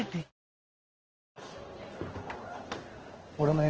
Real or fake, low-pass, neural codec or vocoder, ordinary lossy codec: fake; 7.2 kHz; codec, 16 kHz in and 24 kHz out, 1 kbps, XY-Tokenizer; Opus, 16 kbps